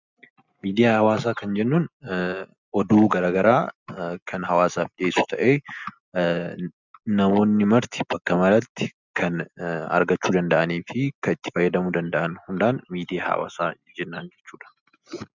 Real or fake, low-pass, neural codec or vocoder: real; 7.2 kHz; none